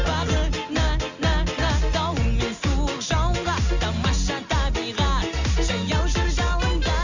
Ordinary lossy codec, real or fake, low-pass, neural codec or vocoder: Opus, 64 kbps; real; 7.2 kHz; none